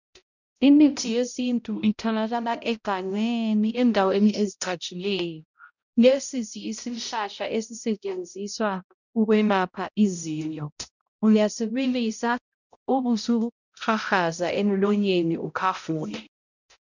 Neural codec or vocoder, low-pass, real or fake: codec, 16 kHz, 0.5 kbps, X-Codec, HuBERT features, trained on balanced general audio; 7.2 kHz; fake